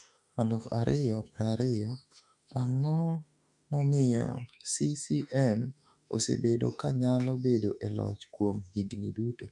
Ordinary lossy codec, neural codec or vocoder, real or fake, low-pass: MP3, 96 kbps; autoencoder, 48 kHz, 32 numbers a frame, DAC-VAE, trained on Japanese speech; fake; 10.8 kHz